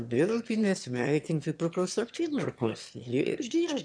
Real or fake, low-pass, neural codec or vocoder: fake; 9.9 kHz; autoencoder, 22.05 kHz, a latent of 192 numbers a frame, VITS, trained on one speaker